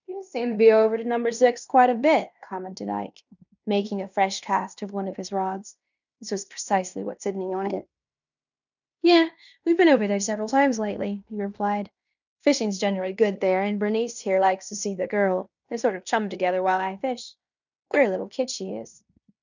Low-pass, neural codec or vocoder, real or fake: 7.2 kHz; codec, 16 kHz in and 24 kHz out, 0.9 kbps, LongCat-Audio-Codec, fine tuned four codebook decoder; fake